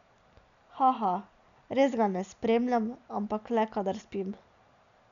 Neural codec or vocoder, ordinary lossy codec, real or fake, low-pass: none; none; real; 7.2 kHz